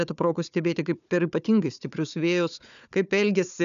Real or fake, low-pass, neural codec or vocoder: fake; 7.2 kHz; codec, 16 kHz, 4 kbps, FunCodec, trained on Chinese and English, 50 frames a second